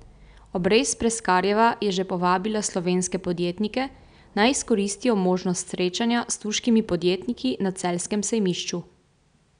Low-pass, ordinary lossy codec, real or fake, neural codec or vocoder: 9.9 kHz; none; real; none